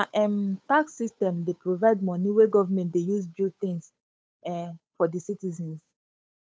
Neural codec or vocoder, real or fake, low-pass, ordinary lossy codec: codec, 16 kHz, 8 kbps, FunCodec, trained on Chinese and English, 25 frames a second; fake; none; none